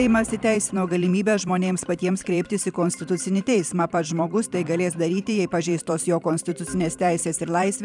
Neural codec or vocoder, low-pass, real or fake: vocoder, 44.1 kHz, 128 mel bands every 512 samples, BigVGAN v2; 10.8 kHz; fake